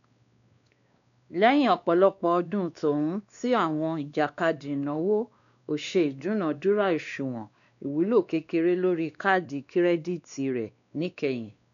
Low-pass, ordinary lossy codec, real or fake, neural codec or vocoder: 7.2 kHz; none; fake; codec, 16 kHz, 2 kbps, X-Codec, WavLM features, trained on Multilingual LibriSpeech